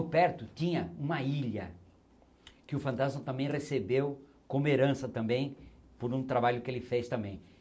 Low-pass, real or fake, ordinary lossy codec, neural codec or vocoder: none; real; none; none